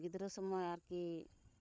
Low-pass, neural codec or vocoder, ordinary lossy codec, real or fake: none; codec, 16 kHz, 16 kbps, FreqCodec, larger model; none; fake